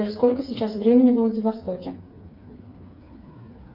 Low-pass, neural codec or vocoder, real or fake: 5.4 kHz; codec, 16 kHz, 4 kbps, FreqCodec, smaller model; fake